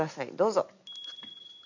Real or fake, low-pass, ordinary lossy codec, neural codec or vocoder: real; 7.2 kHz; none; none